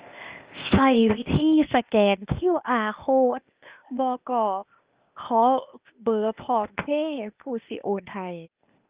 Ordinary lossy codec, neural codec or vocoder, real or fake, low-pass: Opus, 24 kbps; codec, 16 kHz, 0.8 kbps, ZipCodec; fake; 3.6 kHz